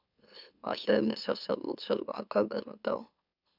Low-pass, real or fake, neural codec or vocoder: 5.4 kHz; fake; autoencoder, 44.1 kHz, a latent of 192 numbers a frame, MeloTTS